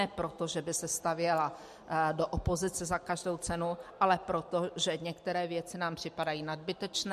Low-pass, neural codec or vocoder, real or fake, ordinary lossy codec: 14.4 kHz; none; real; MP3, 64 kbps